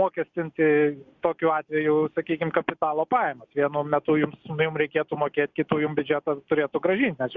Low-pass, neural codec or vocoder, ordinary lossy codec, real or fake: 7.2 kHz; none; Opus, 64 kbps; real